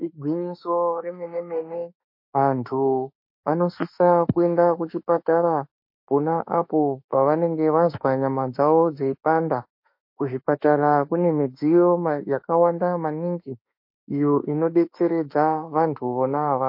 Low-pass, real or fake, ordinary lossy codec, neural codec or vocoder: 5.4 kHz; fake; MP3, 32 kbps; autoencoder, 48 kHz, 32 numbers a frame, DAC-VAE, trained on Japanese speech